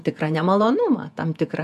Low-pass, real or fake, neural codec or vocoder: 14.4 kHz; fake; vocoder, 48 kHz, 128 mel bands, Vocos